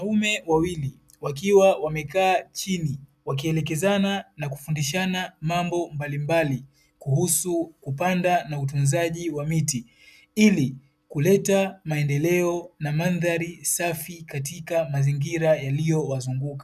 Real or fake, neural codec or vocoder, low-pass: real; none; 14.4 kHz